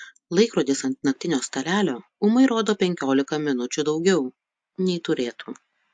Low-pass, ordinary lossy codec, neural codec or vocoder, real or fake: 9.9 kHz; AAC, 64 kbps; none; real